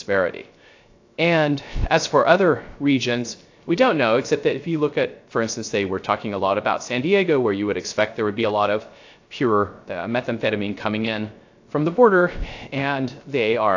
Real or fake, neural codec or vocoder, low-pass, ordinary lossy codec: fake; codec, 16 kHz, 0.3 kbps, FocalCodec; 7.2 kHz; AAC, 48 kbps